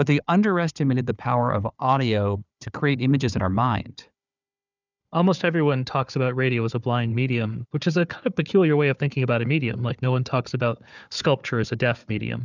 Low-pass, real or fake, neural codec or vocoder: 7.2 kHz; fake; codec, 16 kHz, 4 kbps, FunCodec, trained on Chinese and English, 50 frames a second